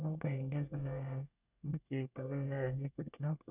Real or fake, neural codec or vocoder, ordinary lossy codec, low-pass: fake; codec, 24 kHz, 1 kbps, SNAC; none; 3.6 kHz